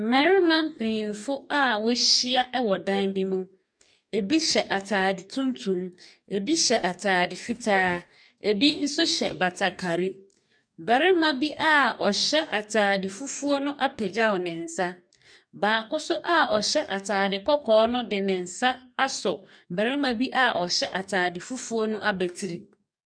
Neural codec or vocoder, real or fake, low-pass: codec, 44.1 kHz, 2.6 kbps, DAC; fake; 9.9 kHz